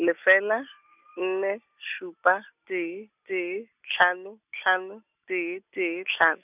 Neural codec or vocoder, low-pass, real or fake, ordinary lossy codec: none; 3.6 kHz; real; none